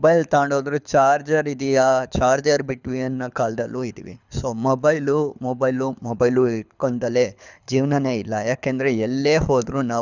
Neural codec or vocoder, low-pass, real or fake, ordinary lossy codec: codec, 24 kHz, 6 kbps, HILCodec; 7.2 kHz; fake; none